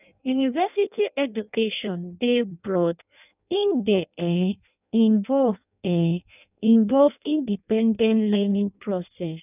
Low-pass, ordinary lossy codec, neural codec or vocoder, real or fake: 3.6 kHz; none; codec, 16 kHz in and 24 kHz out, 0.6 kbps, FireRedTTS-2 codec; fake